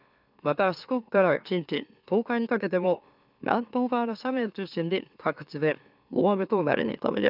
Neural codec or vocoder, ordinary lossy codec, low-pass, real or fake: autoencoder, 44.1 kHz, a latent of 192 numbers a frame, MeloTTS; none; 5.4 kHz; fake